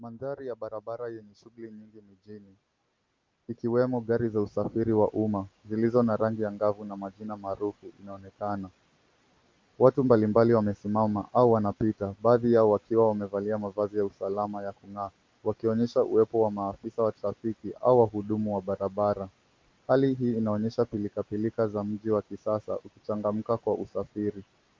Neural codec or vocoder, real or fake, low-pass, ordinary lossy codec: none; real; 7.2 kHz; Opus, 24 kbps